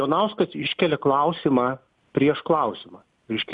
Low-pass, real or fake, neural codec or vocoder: 10.8 kHz; real; none